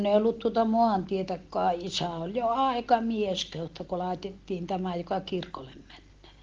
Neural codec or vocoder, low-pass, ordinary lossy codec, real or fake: none; 7.2 kHz; Opus, 16 kbps; real